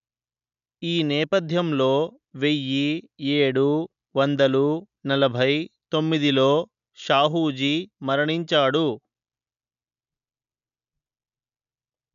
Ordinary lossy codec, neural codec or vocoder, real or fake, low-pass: none; none; real; 7.2 kHz